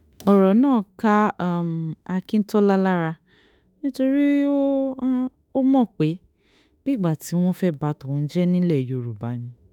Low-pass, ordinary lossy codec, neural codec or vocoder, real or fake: 19.8 kHz; none; autoencoder, 48 kHz, 32 numbers a frame, DAC-VAE, trained on Japanese speech; fake